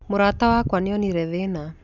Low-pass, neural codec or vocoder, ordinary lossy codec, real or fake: 7.2 kHz; none; none; real